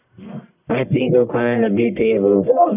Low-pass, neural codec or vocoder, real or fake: 3.6 kHz; codec, 44.1 kHz, 1.7 kbps, Pupu-Codec; fake